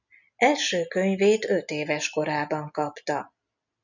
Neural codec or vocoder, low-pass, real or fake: none; 7.2 kHz; real